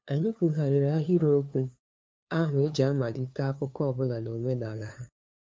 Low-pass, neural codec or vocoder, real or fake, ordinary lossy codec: none; codec, 16 kHz, 2 kbps, FunCodec, trained on LibriTTS, 25 frames a second; fake; none